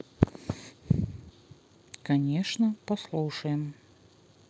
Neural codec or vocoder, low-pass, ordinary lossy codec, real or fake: none; none; none; real